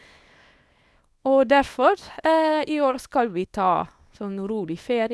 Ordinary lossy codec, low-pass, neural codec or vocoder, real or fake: none; none; codec, 24 kHz, 0.9 kbps, WavTokenizer, small release; fake